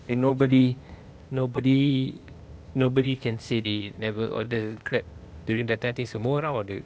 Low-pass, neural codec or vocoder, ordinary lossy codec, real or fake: none; codec, 16 kHz, 0.8 kbps, ZipCodec; none; fake